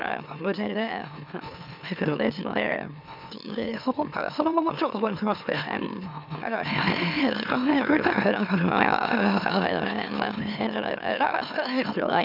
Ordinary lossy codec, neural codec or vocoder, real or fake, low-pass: none; autoencoder, 44.1 kHz, a latent of 192 numbers a frame, MeloTTS; fake; 5.4 kHz